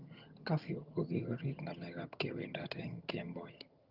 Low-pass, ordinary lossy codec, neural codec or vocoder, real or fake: 5.4 kHz; Opus, 32 kbps; vocoder, 22.05 kHz, 80 mel bands, HiFi-GAN; fake